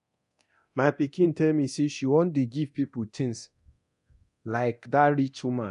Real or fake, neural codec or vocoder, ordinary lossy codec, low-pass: fake; codec, 24 kHz, 0.9 kbps, DualCodec; none; 10.8 kHz